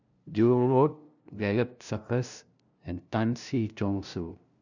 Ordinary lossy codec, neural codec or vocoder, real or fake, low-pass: none; codec, 16 kHz, 0.5 kbps, FunCodec, trained on LibriTTS, 25 frames a second; fake; 7.2 kHz